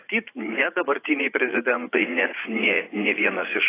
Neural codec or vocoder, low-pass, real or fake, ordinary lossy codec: vocoder, 44.1 kHz, 80 mel bands, Vocos; 3.6 kHz; fake; AAC, 16 kbps